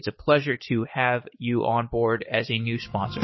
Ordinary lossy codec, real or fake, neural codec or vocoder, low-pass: MP3, 24 kbps; fake; codec, 16 kHz, 6 kbps, DAC; 7.2 kHz